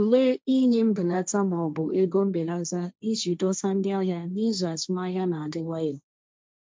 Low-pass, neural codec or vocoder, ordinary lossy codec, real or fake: none; codec, 16 kHz, 1.1 kbps, Voila-Tokenizer; none; fake